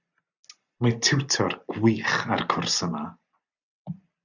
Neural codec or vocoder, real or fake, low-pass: none; real; 7.2 kHz